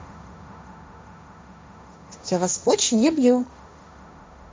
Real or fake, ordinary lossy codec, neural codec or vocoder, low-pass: fake; none; codec, 16 kHz, 1.1 kbps, Voila-Tokenizer; none